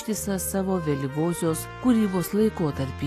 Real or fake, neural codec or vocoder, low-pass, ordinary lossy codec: real; none; 14.4 kHz; AAC, 48 kbps